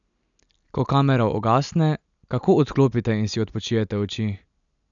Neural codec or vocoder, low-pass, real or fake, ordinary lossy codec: none; 7.2 kHz; real; none